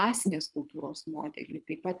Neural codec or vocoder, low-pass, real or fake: codec, 24 kHz, 3 kbps, HILCodec; 10.8 kHz; fake